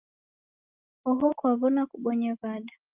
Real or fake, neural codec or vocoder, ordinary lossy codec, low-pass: real; none; Opus, 32 kbps; 3.6 kHz